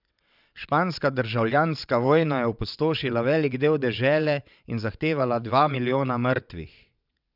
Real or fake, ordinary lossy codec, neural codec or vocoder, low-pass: fake; none; vocoder, 22.05 kHz, 80 mel bands, WaveNeXt; 5.4 kHz